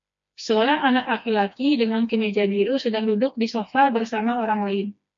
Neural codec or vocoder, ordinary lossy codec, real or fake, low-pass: codec, 16 kHz, 2 kbps, FreqCodec, smaller model; MP3, 48 kbps; fake; 7.2 kHz